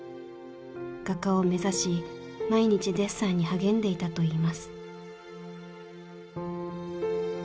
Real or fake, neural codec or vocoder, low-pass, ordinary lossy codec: real; none; none; none